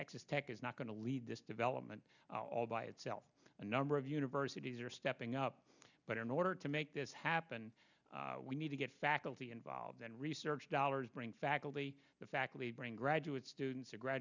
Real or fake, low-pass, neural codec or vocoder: real; 7.2 kHz; none